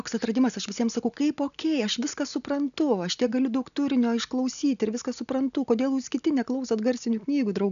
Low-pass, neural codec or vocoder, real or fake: 7.2 kHz; none; real